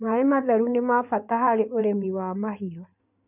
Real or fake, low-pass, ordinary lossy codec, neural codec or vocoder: fake; 3.6 kHz; none; codec, 16 kHz in and 24 kHz out, 2.2 kbps, FireRedTTS-2 codec